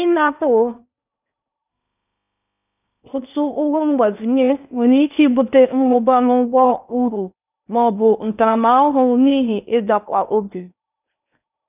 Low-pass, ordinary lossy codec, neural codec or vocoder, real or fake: 3.6 kHz; none; codec, 16 kHz in and 24 kHz out, 0.8 kbps, FocalCodec, streaming, 65536 codes; fake